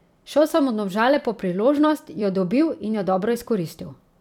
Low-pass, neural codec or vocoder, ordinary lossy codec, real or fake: 19.8 kHz; vocoder, 44.1 kHz, 128 mel bands every 256 samples, BigVGAN v2; none; fake